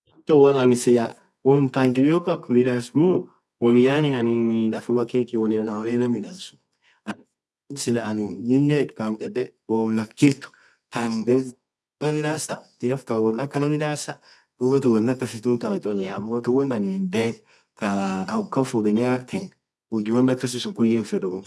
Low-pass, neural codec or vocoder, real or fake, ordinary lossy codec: none; codec, 24 kHz, 0.9 kbps, WavTokenizer, medium music audio release; fake; none